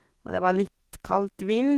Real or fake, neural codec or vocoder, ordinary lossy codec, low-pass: fake; codec, 32 kHz, 1.9 kbps, SNAC; Opus, 16 kbps; 14.4 kHz